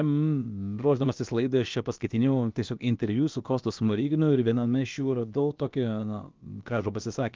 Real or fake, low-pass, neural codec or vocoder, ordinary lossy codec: fake; 7.2 kHz; codec, 16 kHz, about 1 kbps, DyCAST, with the encoder's durations; Opus, 24 kbps